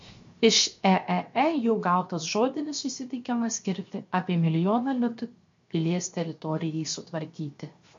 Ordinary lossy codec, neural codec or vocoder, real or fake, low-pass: MP3, 48 kbps; codec, 16 kHz, 0.7 kbps, FocalCodec; fake; 7.2 kHz